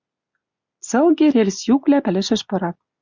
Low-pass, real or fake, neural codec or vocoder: 7.2 kHz; real; none